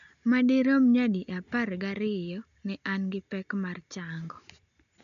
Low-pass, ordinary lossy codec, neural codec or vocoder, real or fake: 7.2 kHz; none; none; real